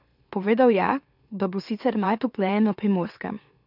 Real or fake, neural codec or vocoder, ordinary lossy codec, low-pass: fake; autoencoder, 44.1 kHz, a latent of 192 numbers a frame, MeloTTS; none; 5.4 kHz